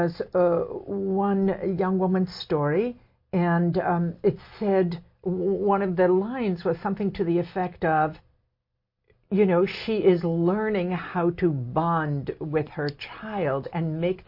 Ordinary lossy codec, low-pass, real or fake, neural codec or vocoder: MP3, 32 kbps; 5.4 kHz; real; none